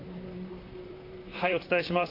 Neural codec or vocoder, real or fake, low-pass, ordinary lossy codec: vocoder, 44.1 kHz, 128 mel bands, Pupu-Vocoder; fake; 5.4 kHz; AAC, 24 kbps